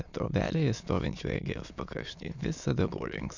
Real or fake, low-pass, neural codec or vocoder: fake; 7.2 kHz; autoencoder, 22.05 kHz, a latent of 192 numbers a frame, VITS, trained on many speakers